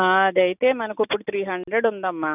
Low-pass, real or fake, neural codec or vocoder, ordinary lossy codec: 3.6 kHz; real; none; none